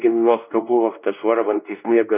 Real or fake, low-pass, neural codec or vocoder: fake; 3.6 kHz; codec, 16 kHz, 1 kbps, X-Codec, WavLM features, trained on Multilingual LibriSpeech